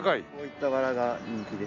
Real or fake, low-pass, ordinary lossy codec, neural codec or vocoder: real; 7.2 kHz; none; none